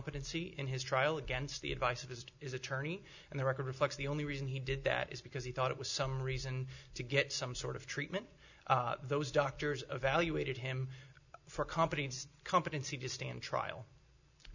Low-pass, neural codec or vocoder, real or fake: 7.2 kHz; none; real